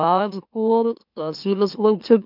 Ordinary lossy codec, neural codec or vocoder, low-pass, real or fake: none; autoencoder, 44.1 kHz, a latent of 192 numbers a frame, MeloTTS; 5.4 kHz; fake